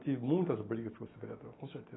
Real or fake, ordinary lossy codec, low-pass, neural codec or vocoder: real; AAC, 16 kbps; 7.2 kHz; none